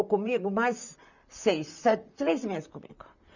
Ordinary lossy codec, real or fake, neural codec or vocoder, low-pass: none; fake; vocoder, 44.1 kHz, 128 mel bands, Pupu-Vocoder; 7.2 kHz